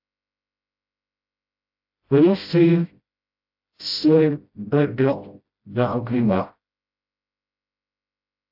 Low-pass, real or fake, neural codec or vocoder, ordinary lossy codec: 5.4 kHz; fake; codec, 16 kHz, 0.5 kbps, FreqCodec, smaller model; AAC, 48 kbps